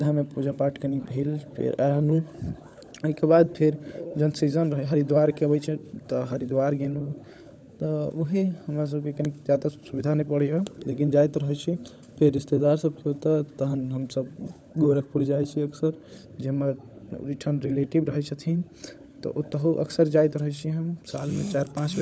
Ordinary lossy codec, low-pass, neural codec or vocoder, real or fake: none; none; codec, 16 kHz, 16 kbps, FunCodec, trained on LibriTTS, 50 frames a second; fake